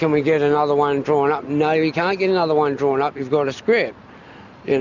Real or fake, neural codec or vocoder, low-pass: real; none; 7.2 kHz